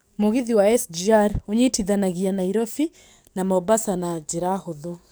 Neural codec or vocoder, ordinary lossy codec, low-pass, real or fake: codec, 44.1 kHz, 7.8 kbps, DAC; none; none; fake